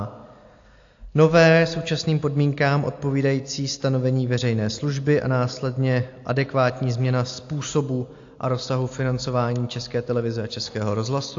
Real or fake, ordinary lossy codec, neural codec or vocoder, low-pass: real; AAC, 48 kbps; none; 7.2 kHz